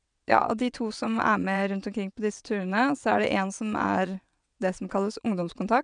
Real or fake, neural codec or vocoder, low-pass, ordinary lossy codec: fake; vocoder, 22.05 kHz, 80 mel bands, WaveNeXt; 9.9 kHz; none